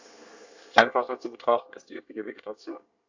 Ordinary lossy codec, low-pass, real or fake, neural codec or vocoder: AAC, 48 kbps; 7.2 kHz; fake; codec, 24 kHz, 1 kbps, SNAC